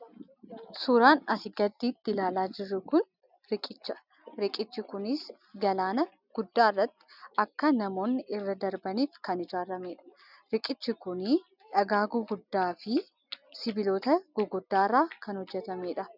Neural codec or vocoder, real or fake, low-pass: none; real; 5.4 kHz